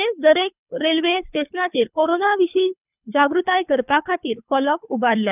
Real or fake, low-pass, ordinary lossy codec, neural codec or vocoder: fake; 3.6 kHz; none; codec, 24 kHz, 3 kbps, HILCodec